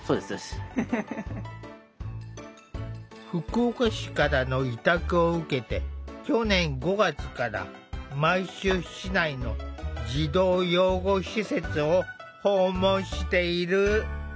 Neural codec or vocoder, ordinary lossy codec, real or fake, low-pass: none; none; real; none